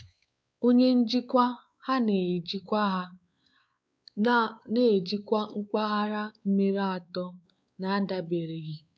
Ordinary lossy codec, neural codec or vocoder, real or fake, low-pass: none; codec, 16 kHz, 4 kbps, X-Codec, WavLM features, trained on Multilingual LibriSpeech; fake; none